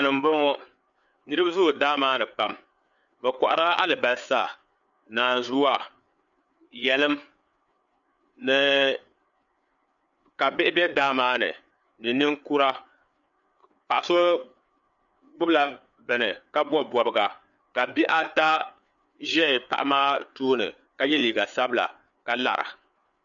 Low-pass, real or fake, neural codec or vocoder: 7.2 kHz; fake; codec, 16 kHz, 4 kbps, FreqCodec, larger model